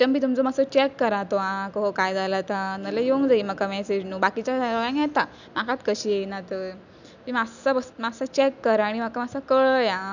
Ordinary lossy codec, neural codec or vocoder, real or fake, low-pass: none; none; real; 7.2 kHz